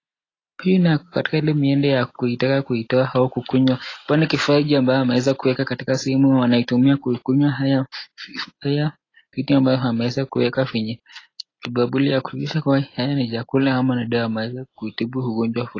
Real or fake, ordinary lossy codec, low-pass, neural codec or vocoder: real; AAC, 32 kbps; 7.2 kHz; none